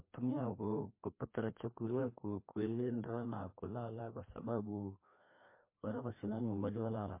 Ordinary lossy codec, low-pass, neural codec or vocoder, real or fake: MP3, 24 kbps; 3.6 kHz; codec, 16 kHz, 2 kbps, FreqCodec, larger model; fake